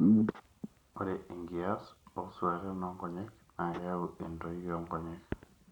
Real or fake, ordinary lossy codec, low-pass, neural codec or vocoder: real; none; 19.8 kHz; none